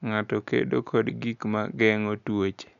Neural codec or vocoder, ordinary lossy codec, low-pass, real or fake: none; none; 7.2 kHz; real